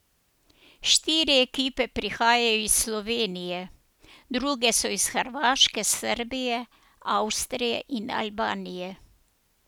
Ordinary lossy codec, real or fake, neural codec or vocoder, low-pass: none; real; none; none